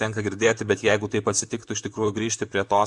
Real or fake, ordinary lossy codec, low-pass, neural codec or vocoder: fake; Opus, 64 kbps; 10.8 kHz; vocoder, 44.1 kHz, 128 mel bands, Pupu-Vocoder